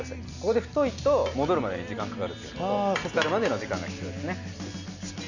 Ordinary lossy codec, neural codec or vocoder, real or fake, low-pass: none; none; real; 7.2 kHz